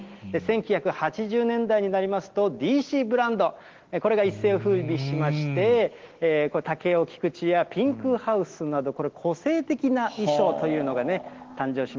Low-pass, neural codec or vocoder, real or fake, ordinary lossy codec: 7.2 kHz; none; real; Opus, 16 kbps